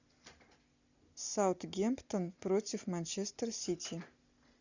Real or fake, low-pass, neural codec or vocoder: real; 7.2 kHz; none